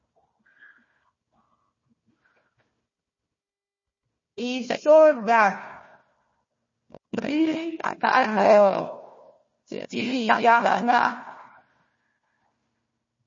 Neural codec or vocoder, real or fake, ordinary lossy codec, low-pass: codec, 16 kHz, 1 kbps, FunCodec, trained on Chinese and English, 50 frames a second; fake; MP3, 32 kbps; 7.2 kHz